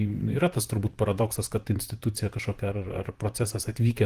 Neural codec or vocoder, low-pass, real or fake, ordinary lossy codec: vocoder, 44.1 kHz, 128 mel bands, Pupu-Vocoder; 14.4 kHz; fake; Opus, 24 kbps